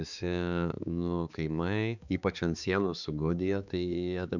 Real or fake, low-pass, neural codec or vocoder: fake; 7.2 kHz; codec, 16 kHz, 4 kbps, X-Codec, HuBERT features, trained on balanced general audio